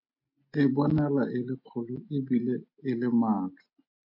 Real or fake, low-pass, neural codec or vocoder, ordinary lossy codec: real; 5.4 kHz; none; MP3, 32 kbps